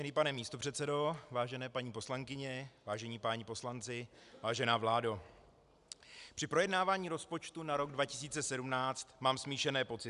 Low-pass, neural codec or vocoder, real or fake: 10.8 kHz; none; real